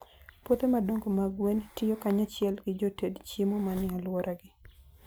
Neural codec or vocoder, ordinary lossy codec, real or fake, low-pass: none; none; real; none